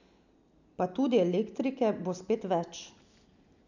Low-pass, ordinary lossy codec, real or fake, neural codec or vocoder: 7.2 kHz; none; real; none